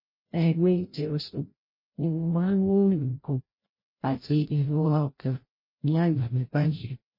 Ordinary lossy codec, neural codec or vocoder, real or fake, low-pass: MP3, 24 kbps; codec, 16 kHz, 0.5 kbps, FreqCodec, larger model; fake; 5.4 kHz